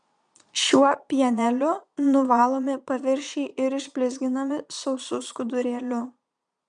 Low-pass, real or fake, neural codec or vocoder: 9.9 kHz; fake; vocoder, 22.05 kHz, 80 mel bands, Vocos